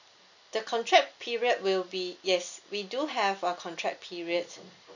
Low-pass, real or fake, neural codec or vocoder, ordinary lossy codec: 7.2 kHz; real; none; none